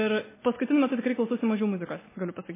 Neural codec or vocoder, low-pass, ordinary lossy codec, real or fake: none; 3.6 kHz; MP3, 16 kbps; real